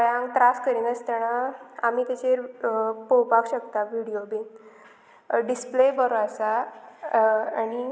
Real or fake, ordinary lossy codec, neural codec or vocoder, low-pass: real; none; none; none